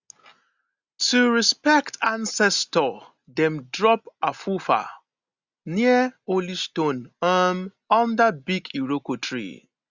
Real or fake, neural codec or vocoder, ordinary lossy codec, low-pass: real; none; Opus, 64 kbps; 7.2 kHz